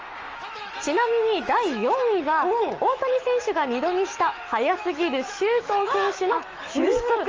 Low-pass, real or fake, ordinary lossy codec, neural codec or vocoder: 7.2 kHz; fake; Opus, 24 kbps; autoencoder, 48 kHz, 128 numbers a frame, DAC-VAE, trained on Japanese speech